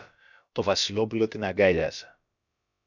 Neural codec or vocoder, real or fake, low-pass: codec, 16 kHz, about 1 kbps, DyCAST, with the encoder's durations; fake; 7.2 kHz